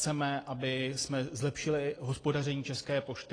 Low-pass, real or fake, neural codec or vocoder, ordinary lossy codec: 9.9 kHz; real; none; AAC, 32 kbps